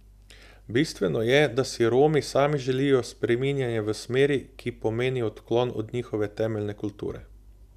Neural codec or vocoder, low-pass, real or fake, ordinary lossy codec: none; 14.4 kHz; real; none